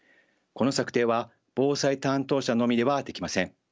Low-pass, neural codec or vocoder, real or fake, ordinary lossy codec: none; none; real; none